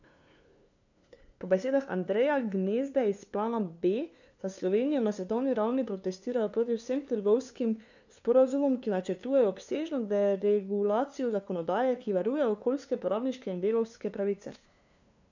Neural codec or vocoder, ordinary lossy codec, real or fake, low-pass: codec, 16 kHz, 2 kbps, FunCodec, trained on LibriTTS, 25 frames a second; none; fake; 7.2 kHz